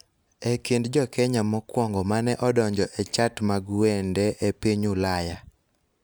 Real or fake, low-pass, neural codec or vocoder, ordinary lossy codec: real; none; none; none